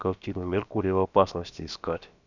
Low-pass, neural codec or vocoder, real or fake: 7.2 kHz; codec, 16 kHz, about 1 kbps, DyCAST, with the encoder's durations; fake